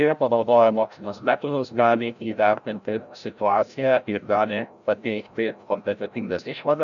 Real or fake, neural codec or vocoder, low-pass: fake; codec, 16 kHz, 0.5 kbps, FreqCodec, larger model; 7.2 kHz